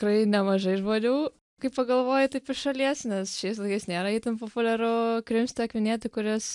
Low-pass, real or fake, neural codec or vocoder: 10.8 kHz; real; none